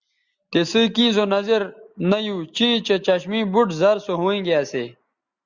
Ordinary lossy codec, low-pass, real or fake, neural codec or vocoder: Opus, 64 kbps; 7.2 kHz; real; none